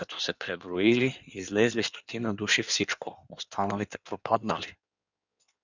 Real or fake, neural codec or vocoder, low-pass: fake; codec, 16 kHz in and 24 kHz out, 1.1 kbps, FireRedTTS-2 codec; 7.2 kHz